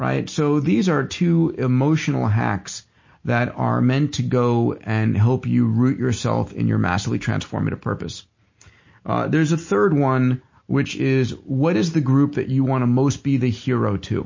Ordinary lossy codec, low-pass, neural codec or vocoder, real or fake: MP3, 32 kbps; 7.2 kHz; none; real